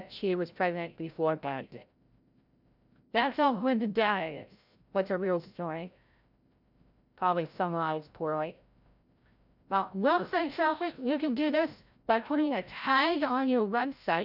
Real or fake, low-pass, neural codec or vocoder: fake; 5.4 kHz; codec, 16 kHz, 0.5 kbps, FreqCodec, larger model